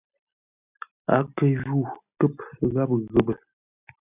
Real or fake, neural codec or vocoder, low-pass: real; none; 3.6 kHz